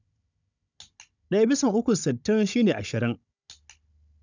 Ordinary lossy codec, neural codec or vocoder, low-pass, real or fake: none; codec, 16 kHz, 16 kbps, FunCodec, trained on Chinese and English, 50 frames a second; 7.2 kHz; fake